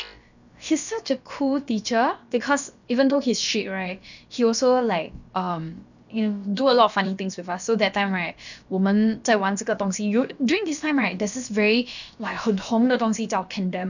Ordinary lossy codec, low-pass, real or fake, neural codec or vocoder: none; 7.2 kHz; fake; codec, 16 kHz, about 1 kbps, DyCAST, with the encoder's durations